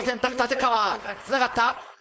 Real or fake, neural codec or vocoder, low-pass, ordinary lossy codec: fake; codec, 16 kHz, 4.8 kbps, FACodec; none; none